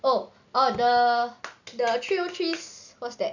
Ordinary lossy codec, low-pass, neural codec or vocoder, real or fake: none; 7.2 kHz; none; real